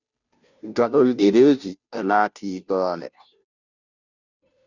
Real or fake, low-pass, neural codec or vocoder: fake; 7.2 kHz; codec, 16 kHz, 0.5 kbps, FunCodec, trained on Chinese and English, 25 frames a second